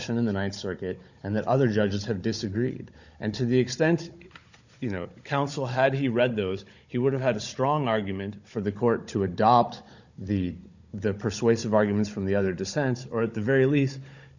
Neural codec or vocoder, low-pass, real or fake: codec, 16 kHz, 16 kbps, FunCodec, trained on Chinese and English, 50 frames a second; 7.2 kHz; fake